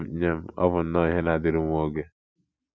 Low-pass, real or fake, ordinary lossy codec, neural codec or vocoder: none; real; none; none